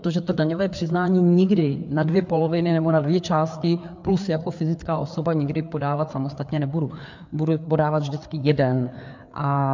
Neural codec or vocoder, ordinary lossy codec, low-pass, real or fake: codec, 16 kHz, 4 kbps, FreqCodec, larger model; MP3, 64 kbps; 7.2 kHz; fake